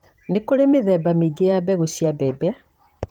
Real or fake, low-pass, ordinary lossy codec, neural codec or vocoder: fake; 19.8 kHz; Opus, 24 kbps; vocoder, 44.1 kHz, 128 mel bands, Pupu-Vocoder